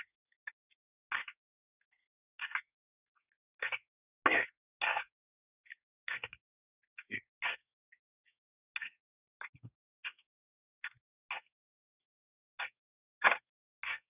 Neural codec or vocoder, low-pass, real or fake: codec, 24 kHz, 1 kbps, SNAC; 3.6 kHz; fake